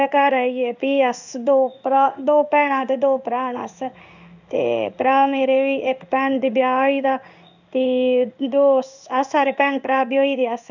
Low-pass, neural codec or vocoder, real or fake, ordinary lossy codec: 7.2 kHz; codec, 16 kHz in and 24 kHz out, 1 kbps, XY-Tokenizer; fake; none